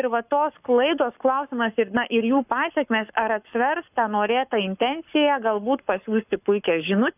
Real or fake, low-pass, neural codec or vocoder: fake; 3.6 kHz; codec, 44.1 kHz, 7.8 kbps, Pupu-Codec